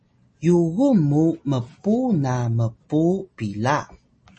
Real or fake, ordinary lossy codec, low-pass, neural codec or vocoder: real; MP3, 32 kbps; 10.8 kHz; none